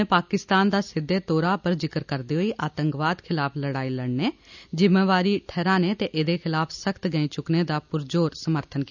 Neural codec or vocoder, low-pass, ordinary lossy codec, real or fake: none; 7.2 kHz; none; real